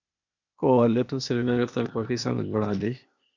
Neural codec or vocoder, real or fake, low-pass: codec, 16 kHz, 0.8 kbps, ZipCodec; fake; 7.2 kHz